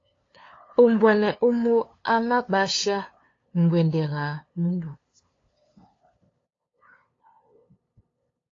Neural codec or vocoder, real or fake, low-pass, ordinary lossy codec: codec, 16 kHz, 2 kbps, FunCodec, trained on LibriTTS, 25 frames a second; fake; 7.2 kHz; AAC, 32 kbps